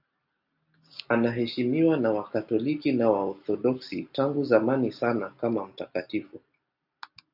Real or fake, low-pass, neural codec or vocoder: real; 5.4 kHz; none